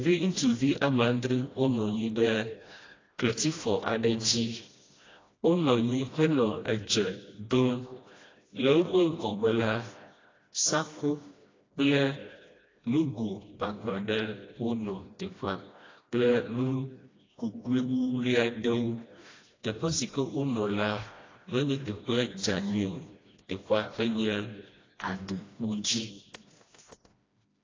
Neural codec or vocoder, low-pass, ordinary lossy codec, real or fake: codec, 16 kHz, 1 kbps, FreqCodec, smaller model; 7.2 kHz; AAC, 32 kbps; fake